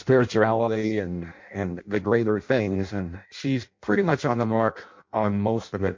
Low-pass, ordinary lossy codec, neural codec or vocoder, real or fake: 7.2 kHz; MP3, 48 kbps; codec, 16 kHz in and 24 kHz out, 0.6 kbps, FireRedTTS-2 codec; fake